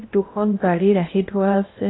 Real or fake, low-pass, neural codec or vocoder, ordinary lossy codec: fake; 7.2 kHz; codec, 16 kHz in and 24 kHz out, 0.8 kbps, FocalCodec, streaming, 65536 codes; AAC, 16 kbps